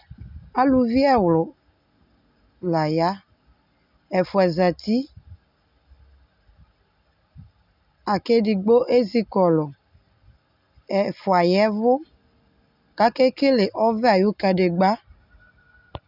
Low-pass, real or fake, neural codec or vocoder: 5.4 kHz; real; none